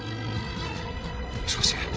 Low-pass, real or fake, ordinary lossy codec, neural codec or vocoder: none; fake; none; codec, 16 kHz, 16 kbps, FreqCodec, larger model